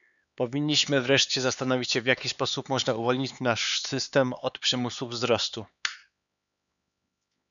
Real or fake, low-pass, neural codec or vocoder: fake; 7.2 kHz; codec, 16 kHz, 4 kbps, X-Codec, HuBERT features, trained on LibriSpeech